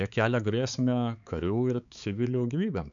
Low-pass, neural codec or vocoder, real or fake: 7.2 kHz; codec, 16 kHz, 4 kbps, X-Codec, HuBERT features, trained on balanced general audio; fake